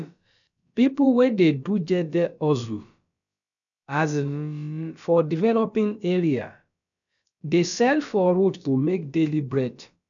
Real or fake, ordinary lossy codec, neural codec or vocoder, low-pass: fake; none; codec, 16 kHz, about 1 kbps, DyCAST, with the encoder's durations; 7.2 kHz